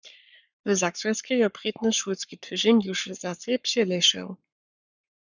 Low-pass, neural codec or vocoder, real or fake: 7.2 kHz; codec, 44.1 kHz, 7.8 kbps, Pupu-Codec; fake